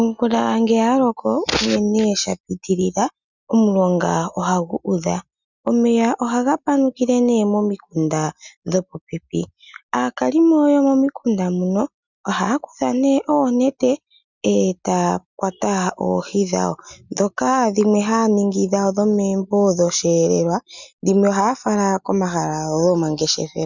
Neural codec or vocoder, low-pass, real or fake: none; 7.2 kHz; real